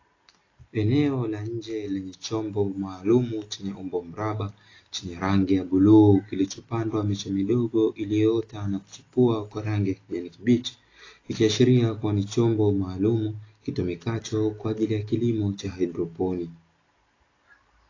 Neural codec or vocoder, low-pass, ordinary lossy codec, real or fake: none; 7.2 kHz; AAC, 32 kbps; real